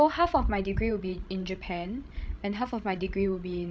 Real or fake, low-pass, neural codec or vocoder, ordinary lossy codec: fake; none; codec, 16 kHz, 16 kbps, FreqCodec, larger model; none